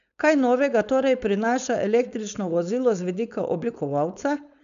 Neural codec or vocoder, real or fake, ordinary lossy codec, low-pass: codec, 16 kHz, 4.8 kbps, FACodec; fake; none; 7.2 kHz